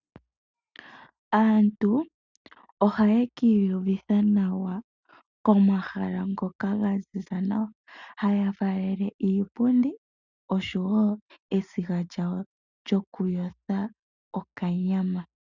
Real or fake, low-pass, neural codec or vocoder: real; 7.2 kHz; none